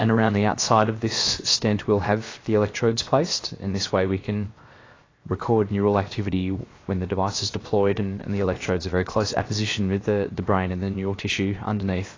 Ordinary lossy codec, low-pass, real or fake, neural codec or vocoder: AAC, 32 kbps; 7.2 kHz; fake; codec, 16 kHz, 0.7 kbps, FocalCodec